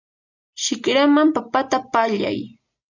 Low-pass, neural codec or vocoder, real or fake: 7.2 kHz; none; real